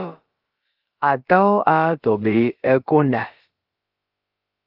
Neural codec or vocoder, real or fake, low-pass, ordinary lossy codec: codec, 16 kHz, about 1 kbps, DyCAST, with the encoder's durations; fake; 5.4 kHz; Opus, 32 kbps